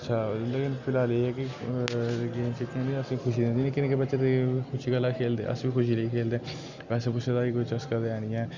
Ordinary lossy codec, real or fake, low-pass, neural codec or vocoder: Opus, 64 kbps; real; 7.2 kHz; none